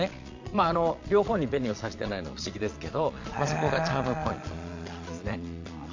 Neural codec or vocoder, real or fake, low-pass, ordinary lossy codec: vocoder, 22.05 kHz, 80 mel bands, Vocos; fake; 7.2 kHz; MP3, 48 kbps